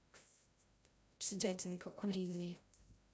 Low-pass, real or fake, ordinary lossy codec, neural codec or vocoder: none; fake; none; codec, 16 kHz, 0.5 kbps, FreqCodec, larger model